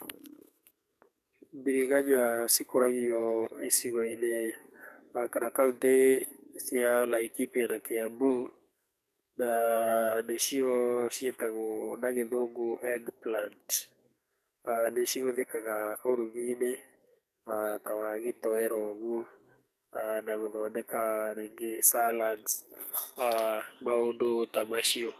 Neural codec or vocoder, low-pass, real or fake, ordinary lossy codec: codec, 44.1 kHz, 2.6 kbps, SNAC; 14.4 kHz; fake; none